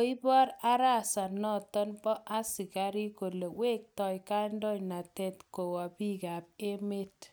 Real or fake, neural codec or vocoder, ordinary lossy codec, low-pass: real; none; none; none